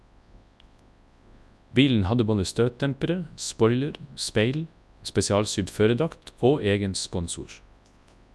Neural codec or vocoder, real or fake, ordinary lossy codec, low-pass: codec, 24 kHz, 0.9 kbps, WavTokenizer, large speech release; fake; none; none